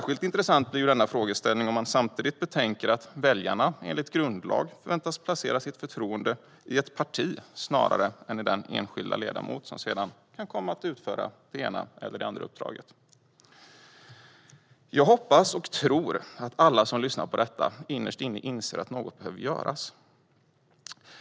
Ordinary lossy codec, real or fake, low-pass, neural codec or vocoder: none; real; none; none